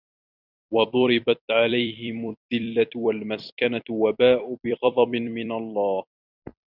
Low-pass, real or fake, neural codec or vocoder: 5.4 kHz; fake; vocoder, 44.1 kHz, 128 mel bands every 256 samples, BigVGAN v2